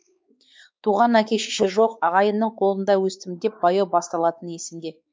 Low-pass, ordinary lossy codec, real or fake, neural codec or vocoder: none; none; fake; codec, 16 kHz, 4 kbps, X-Codec, WavLM features, trained on Multilingual LibriSpeech